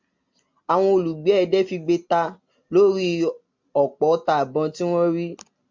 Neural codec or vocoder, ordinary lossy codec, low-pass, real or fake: none; MP3, 64 kbps; 7.2 kHz; real